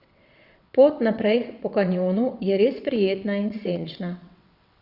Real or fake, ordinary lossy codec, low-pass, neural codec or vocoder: fake; Opus, 64 kbps; 5.4 kHz; vocoder, 22.05 kHz, 80 mel bands, WaveNeXt